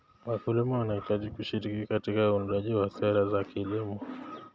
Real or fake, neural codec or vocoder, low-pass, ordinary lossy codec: real; none; none; none